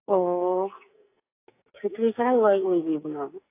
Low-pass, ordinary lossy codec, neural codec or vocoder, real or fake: 3.6 kHz; AAC, 24 kbps; codec, 44.1 kHz, 2.6 kbps, SNAC; fake